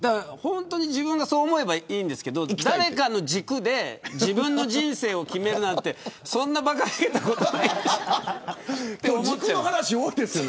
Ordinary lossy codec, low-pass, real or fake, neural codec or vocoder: none; none; real; none